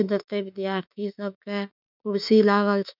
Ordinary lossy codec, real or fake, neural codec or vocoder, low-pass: none; fake; autoencoder, 48 kHz, 32 numbers a frame, DAC-VAE, trained on Japanese speech; 5.4 kHz